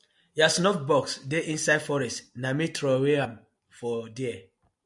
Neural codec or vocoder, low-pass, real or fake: none; 10.8 kHz; real